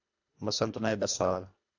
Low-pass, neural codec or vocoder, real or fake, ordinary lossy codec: 7.2 kHz; codec, 24 kHz, 1.5 kbps, HILCodec; fake; AAC, 48 kbps